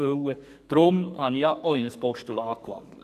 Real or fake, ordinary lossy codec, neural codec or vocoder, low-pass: fake; none; codec, 44.1 kHz, 2.6 kbps, SNAC; 14.4 kHz